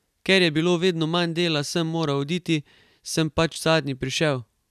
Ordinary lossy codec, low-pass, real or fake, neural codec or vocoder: none; 14.4 kHz; real; none